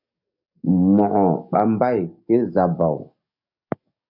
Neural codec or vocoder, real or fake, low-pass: codec, 44.1 kHz, 7.8 kbps, DAC; fake; 5.4 kHz